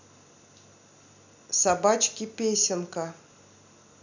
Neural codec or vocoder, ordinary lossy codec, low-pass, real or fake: none; none; 7.2 kHz; real